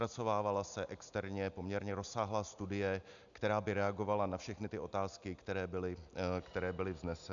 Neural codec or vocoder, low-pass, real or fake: none; 7.2 kHz; real